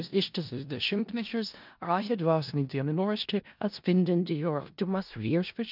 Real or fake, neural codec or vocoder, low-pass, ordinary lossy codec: fake; codec, 16 kHz in and 24 kHz out, 0.4 kbps, LongCat-Audio-Codec, four codebook decoder; 5.4 kHz; MP3, 48 kbps